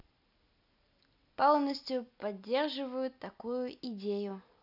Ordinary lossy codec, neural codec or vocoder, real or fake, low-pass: none; none; real; 5.4 kHz